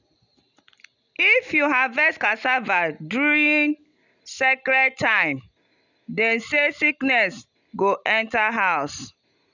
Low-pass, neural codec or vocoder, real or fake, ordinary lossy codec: 7.2 kHz; none; real; none